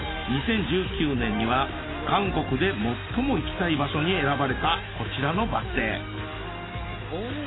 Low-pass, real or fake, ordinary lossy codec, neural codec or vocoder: 7.2 kHz; real; AAC, 16 kbps; none